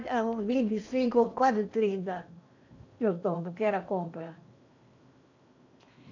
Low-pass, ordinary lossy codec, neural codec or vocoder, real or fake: 7.2 kHz; none; codec, 16 kHz in and 24 kHz out, 0.8 kbps, FocalCodec, streaming, 65536 codes; fake